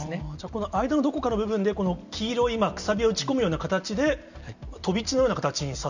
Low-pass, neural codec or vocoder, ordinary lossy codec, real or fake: 7.2 kHz; none; none; real